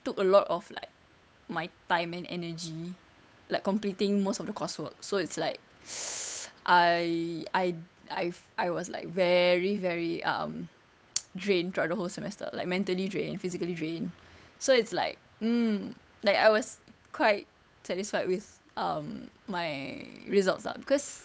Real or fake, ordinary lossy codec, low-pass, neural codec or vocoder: fake; none; none; codec, 16 kHz, 8 kbps, FunCodec, trained on Chinese and English, 25 frames a second